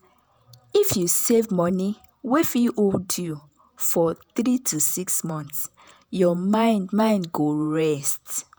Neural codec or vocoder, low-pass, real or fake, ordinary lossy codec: vocoder, 48 kHz, 128 mel bands, Vocos; none; fake; none